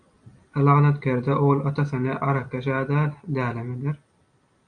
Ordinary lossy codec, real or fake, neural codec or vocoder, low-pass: MP3, 64 kbps; real; none; 9.9 kHz